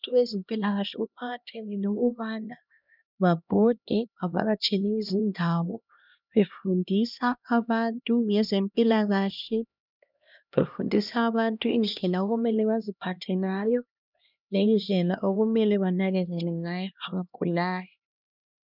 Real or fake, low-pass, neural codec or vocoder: fake; 5.4 kHz; codec, 16 kHz, 1 kbps, X-Codec, HuBERT features, trained on LibriSpeech